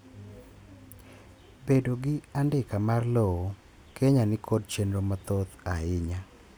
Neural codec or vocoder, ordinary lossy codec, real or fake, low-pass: none; none; real; none